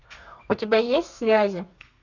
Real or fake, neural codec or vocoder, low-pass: fake; codec, 32 kHz, 1.9 kbps, SNAC; 7.2 kHz